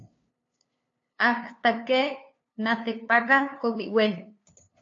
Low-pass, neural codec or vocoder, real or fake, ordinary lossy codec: 7.2 kHz; codec, 16 kHz, 2 kbps, FunCodec, trained on LibriTTS, 25 frames a second; fake; AAC, 48 kbps